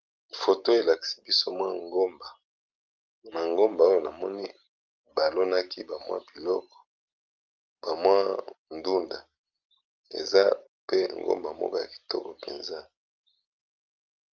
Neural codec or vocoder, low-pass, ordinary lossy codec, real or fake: none; 7.2 kHz; Opus, 24 kbps; real